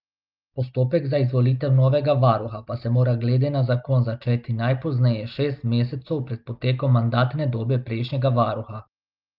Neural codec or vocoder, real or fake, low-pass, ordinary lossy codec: none; real; 5.4 kHz; Opus, 24 kbps